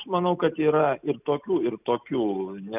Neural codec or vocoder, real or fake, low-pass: none; real; 3.6 kHz